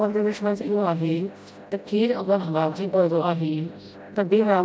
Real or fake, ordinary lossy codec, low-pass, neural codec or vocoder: fake; none; none; codec, 16 kHz, 0.5 kbps, FreqCodec, smaller model